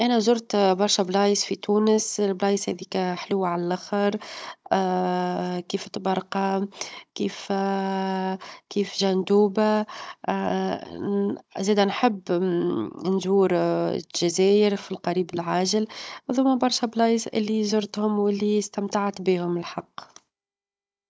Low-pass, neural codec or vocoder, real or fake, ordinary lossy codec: none; codec, 16 kHz, 16 kbps, FunCodec, trained on Chinese and English, 50 frames a second; fake; none